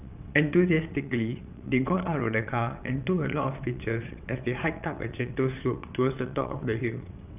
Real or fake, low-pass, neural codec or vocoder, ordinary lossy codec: fake; 3.6 kHz; vocoder, 22.05 kHz, 80 mel bands, WaveNeXt; none